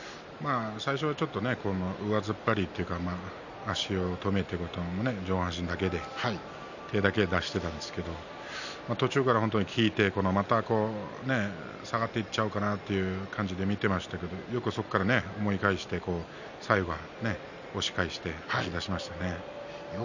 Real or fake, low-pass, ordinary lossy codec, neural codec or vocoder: real; 7.2 kHz; none; none